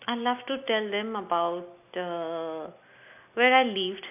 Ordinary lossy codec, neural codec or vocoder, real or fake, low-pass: AAC, 32 kbps; none; real; 3.6 kHz